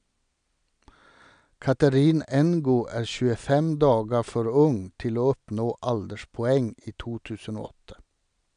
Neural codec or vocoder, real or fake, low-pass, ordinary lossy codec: none; real; 9.9 kHz; MP3, 96 kbps